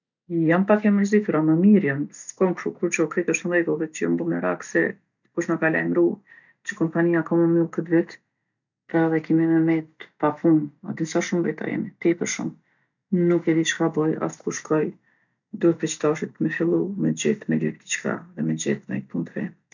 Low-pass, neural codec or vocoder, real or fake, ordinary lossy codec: 7.2 kHz; none; real; none